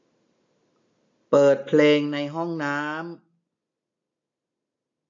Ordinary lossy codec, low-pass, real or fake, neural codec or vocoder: AAC, 48 kbps; 7.2 kHz; real; none